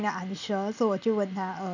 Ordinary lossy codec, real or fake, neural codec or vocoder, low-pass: none; real; none; 7.2 kHz